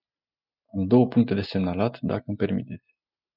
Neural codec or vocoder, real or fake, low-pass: none; real; 5.4 kHz